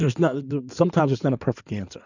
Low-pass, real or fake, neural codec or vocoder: 7.2 kHz; fake; codec, 16 kHz in and 24 kHz out, 2.2 kbps, FireRedTTS-2 codec